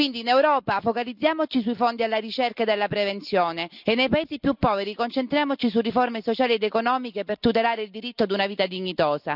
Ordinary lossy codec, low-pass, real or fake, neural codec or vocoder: none; 5.4 kHz; real; none